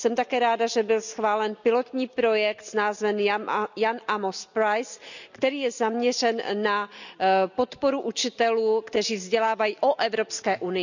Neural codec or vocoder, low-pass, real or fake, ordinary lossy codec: none; 7.2 kHz; real; none